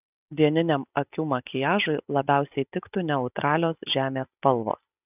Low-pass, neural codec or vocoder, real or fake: 3.6 kHz; none; real